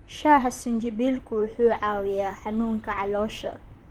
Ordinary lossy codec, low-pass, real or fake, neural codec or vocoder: Opus, 32 kbps; 19.8 kHz; fake; vocoder, 44.1 kHz, 128 mel bands, Pupu-Vocoder